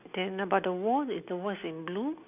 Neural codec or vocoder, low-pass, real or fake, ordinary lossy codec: none; 3.6 kHz; real; none